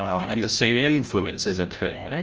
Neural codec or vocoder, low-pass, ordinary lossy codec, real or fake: codec, 16 kHz, 0.5 kbps, FreqCodec, larger model; 7.2 kHz; Opus, 24 kbps; fake